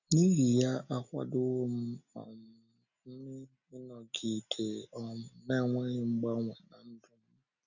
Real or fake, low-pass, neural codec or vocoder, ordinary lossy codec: real; 7.2 kHz; none; none